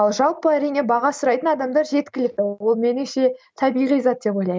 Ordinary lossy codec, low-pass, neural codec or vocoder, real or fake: none; none; none; real